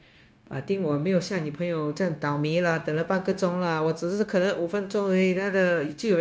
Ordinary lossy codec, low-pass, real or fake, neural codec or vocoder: none; none; fake; codec, 16 kHz, 0.9 kbps, LongCat-Audio-Codec